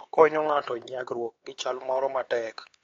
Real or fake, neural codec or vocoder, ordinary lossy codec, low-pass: fake; codec, 16 kHz, 4 kbps, X-Codec, HuBERT features, trained on LibriSpeech; AAC, 32 kbps; 7.2 kHz